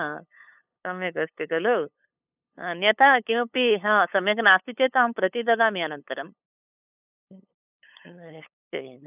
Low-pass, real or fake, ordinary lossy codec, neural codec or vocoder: 3.6 kHz; fake; none; codec, 16 kHz, 8 kbps, FunCodec, trained on LibriTTS, 25 frames a second